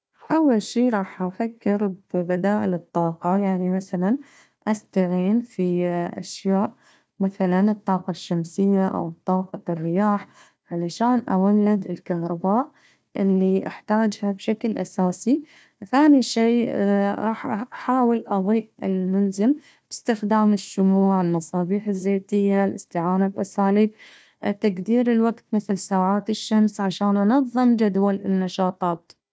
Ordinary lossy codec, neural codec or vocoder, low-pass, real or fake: none; codec, 16 kHz, 1 kbps, FunCodec, trained on Chinese and English, 50 frames a second; none; fake